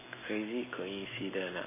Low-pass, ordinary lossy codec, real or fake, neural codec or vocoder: 3.6 kHz; MP3, 16 kbps; real; none